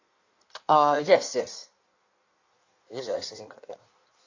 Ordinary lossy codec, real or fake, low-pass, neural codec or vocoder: AAC, 48 kbps; fake; 7.2 kHz; codec, 16 kHz in and 24 kHz out, 1.1 kbps, FireRedTTS-2 codec